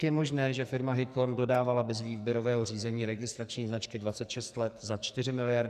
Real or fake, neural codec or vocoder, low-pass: fake; codec, 44.1 kHz, 2.6 kbps, SNAC; 14.4 kHz